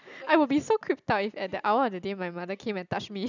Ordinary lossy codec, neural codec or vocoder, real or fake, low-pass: none; none; real; 7.2 kHz